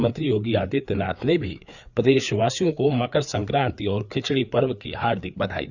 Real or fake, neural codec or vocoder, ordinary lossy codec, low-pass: fake; codec, 16 kHz, 4 kbps, FreqCodec, larger model; none; 7.2 kHz